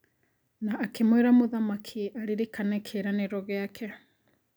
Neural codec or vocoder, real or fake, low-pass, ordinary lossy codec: none; real; none; none